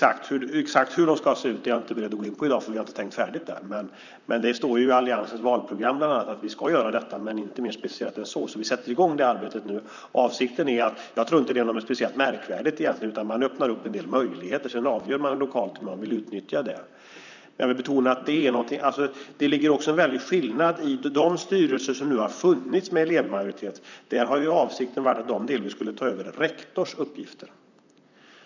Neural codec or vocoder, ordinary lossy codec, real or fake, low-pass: vocoder, 44.1 kHz, 128 mel bands, Pupu-Vocoder; none; fake; 7.2 kHz